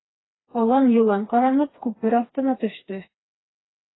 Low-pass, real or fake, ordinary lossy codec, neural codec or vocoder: 7.2 kHz; fake; AAC, 16 kbps; codec, 16 kHz, 2 kbps, FreqCodec, smaller model